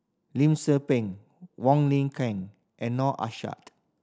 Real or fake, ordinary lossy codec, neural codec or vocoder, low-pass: real; none; none; none